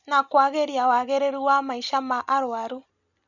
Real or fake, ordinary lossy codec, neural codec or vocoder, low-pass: real; none; none; 7.2 kHz